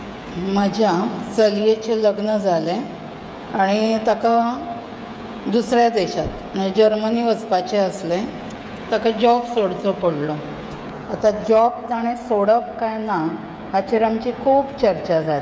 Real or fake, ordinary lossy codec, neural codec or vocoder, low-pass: fake; none; codec, 16 kHz, 16 kbps, FreqCodec, smaller model; none